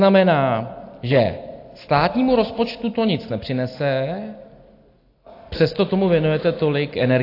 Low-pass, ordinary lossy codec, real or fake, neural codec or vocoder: 5.4 kHz; AAC, 32 kbps; real; none